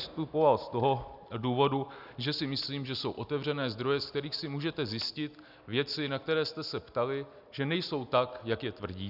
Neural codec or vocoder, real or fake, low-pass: none; real; 5.4 kHz